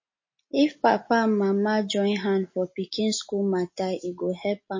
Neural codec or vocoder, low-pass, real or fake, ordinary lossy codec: none; 7.2 kHz; real; MP3, 32 kbps